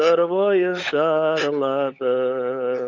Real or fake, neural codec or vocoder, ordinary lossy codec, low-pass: fake; codec, 16 kHz, 16 kbps, FunCodec, trained on LibriTTS, 50 frames a second; none; 7.2 kHz